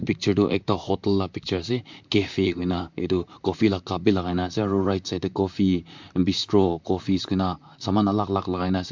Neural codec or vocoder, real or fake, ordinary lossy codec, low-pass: vocoder, 44.1 kHz, 128 mel bands, Pupu-Vocoder; fake; MP3, 64 kbps; 7.2 kHz